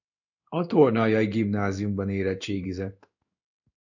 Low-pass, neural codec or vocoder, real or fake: 7.2 kHz; codec, 16 kHz in and 24 kHz out, 1 kbps, XY-Tokenizer; fake